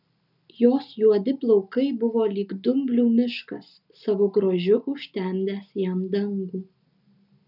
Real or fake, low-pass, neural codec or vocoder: real; 5.4 kHz; none